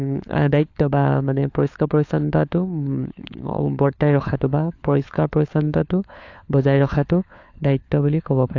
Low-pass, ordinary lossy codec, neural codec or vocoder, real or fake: 7.2 kHz; AAC, 48 kbps; codec, 16 kHz, 4 kbps, FunCodec, trained on LibriTTS, 50 frames a second; fake